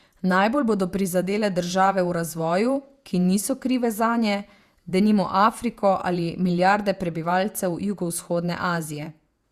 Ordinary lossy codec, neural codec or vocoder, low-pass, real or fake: Opus, 64 kbps; vocoder, 48 kHz, 128 mel bands, Vocos; 14.4 kHz; fake